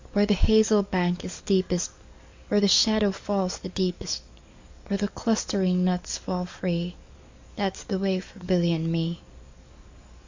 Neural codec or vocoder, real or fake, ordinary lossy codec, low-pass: codec, 44.1 kHz, 7.8 kbps, DAC; fake; MP3, 64 kbps; 7.2 kHz